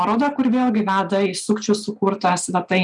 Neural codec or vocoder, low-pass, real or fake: none; 10.8 kHz; real